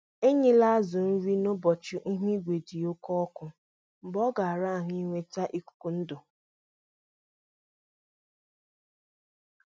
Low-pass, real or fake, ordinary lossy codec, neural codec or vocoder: none; real; none; none